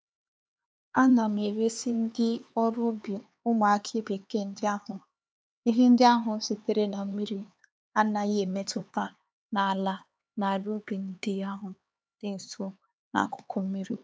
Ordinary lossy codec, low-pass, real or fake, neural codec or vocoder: none; none; fake; codec, 16 kHz, 4 kbps, X-Codec, HuBERT features, trained on LibriSpeech